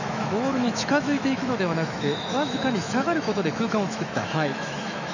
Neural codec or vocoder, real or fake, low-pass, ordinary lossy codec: autoencoder, 48 kHz, 128 numbers a frame, DAC-VAE, trained on Japanese speech; fake; 7.2 kHz; none